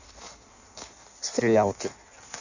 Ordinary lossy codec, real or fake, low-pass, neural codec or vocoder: none; fake; 7.2 kHz; codec, 16 kHz in and 24 kHz out, 1.1 kbps, FireRedTTS-2 codec